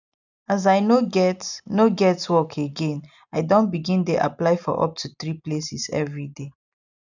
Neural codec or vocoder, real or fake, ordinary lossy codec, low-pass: none; real; none; 7.2 kHz